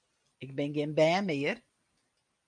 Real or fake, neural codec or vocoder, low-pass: real; none; 9.9 kHz